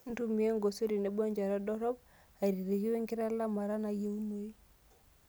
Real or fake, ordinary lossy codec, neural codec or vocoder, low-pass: real; none; none; none